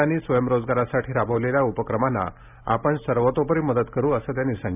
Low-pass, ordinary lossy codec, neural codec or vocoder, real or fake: 3.6 kHz; none; none; real